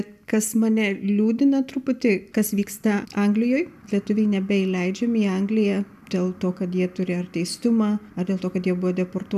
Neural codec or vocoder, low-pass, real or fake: none; 14.4 kHz; real